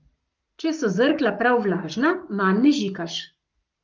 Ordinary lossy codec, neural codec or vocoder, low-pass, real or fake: Opus, 32 kbps; codec, 44.1 kHz, 7.8 kbps, Pupu-Codec; 7.2 kHz; fake